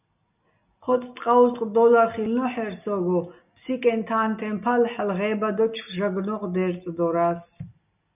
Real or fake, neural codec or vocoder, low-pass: real; none; 3.6 kHz